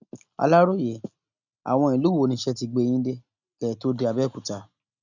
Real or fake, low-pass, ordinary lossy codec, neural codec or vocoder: real; 7.2 kHz; none; none